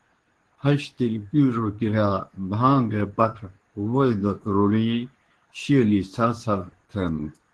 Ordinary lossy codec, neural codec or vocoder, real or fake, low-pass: Opus, 16 kbps; codec, 24 kHz, 0.9 kbps, WavTokenizer, medium speech release version 2; fake; 10.8 kHz